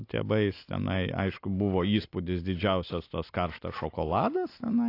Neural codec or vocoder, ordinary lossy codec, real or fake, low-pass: none; AAC, 32 kbps; real; 5.4 kHz